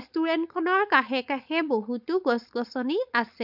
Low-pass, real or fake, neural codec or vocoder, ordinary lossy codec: 5.4 kHz; fake; codec, 16 kHz, 4.8 kbps, FACodec; none